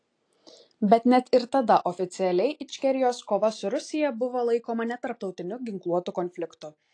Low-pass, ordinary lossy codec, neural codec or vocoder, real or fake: 9.9 kHz; AAC, 48 kbps; none; real